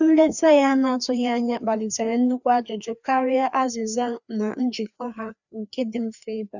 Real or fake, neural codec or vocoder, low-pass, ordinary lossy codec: fake; codec, 16 kHz, 2 kbps, FreqCodec, larger model; 7.2 kHz; none